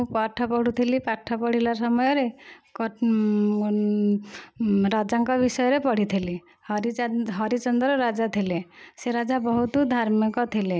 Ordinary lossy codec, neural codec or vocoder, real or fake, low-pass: none; none; real; none